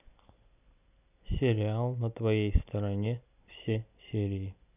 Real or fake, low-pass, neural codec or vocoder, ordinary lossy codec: real; 3.6 kHz; none; none